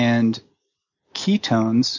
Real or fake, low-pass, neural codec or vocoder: real; 7.2 kHz; none